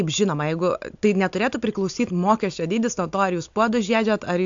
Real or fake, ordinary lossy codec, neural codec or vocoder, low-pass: real; AAC, 64 kbps; none; 7.2 kHz